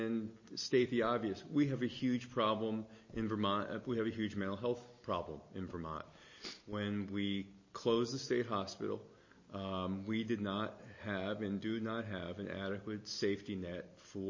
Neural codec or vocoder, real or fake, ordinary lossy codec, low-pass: none; real; MP3, 32 kbps; 7.2 kHz